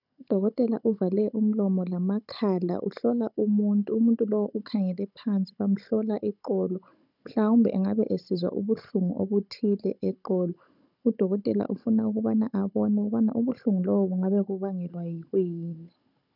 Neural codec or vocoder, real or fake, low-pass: codec, 16 kHz, 16 kbps, FunCodec, trained on Chinese and English, 50 frames a second; fake; 5.4 kHz